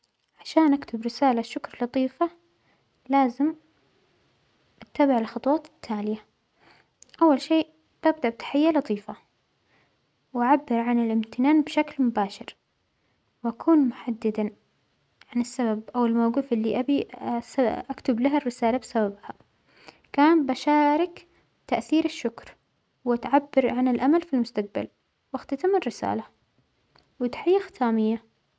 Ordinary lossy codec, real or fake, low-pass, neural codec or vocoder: none; real; none; none